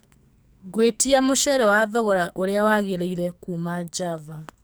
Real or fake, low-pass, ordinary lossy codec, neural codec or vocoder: fake; none; none; codec, 44.1 kHz, 2.6 kbps, SNAC